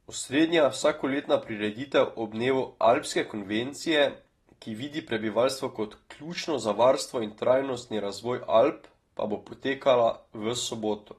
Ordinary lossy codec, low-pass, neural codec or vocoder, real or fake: AAC, 32 kbps; 19.8 kHz; none; real